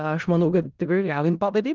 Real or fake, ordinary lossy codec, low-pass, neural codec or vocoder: fake; Opus, 32 kbps; 7.2 kHz; codec, 16 kHz in and 24 kHz out, 0.4 kbps, LongCat-Audio-Codec, four codebook decoder